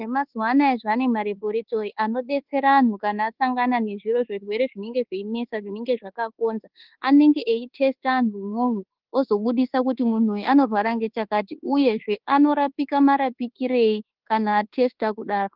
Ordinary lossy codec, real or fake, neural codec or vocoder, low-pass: Opus, 16 kbps; fake; codec, 24 kHz, 1.2 kbps, DualCodec; 5.4 kHz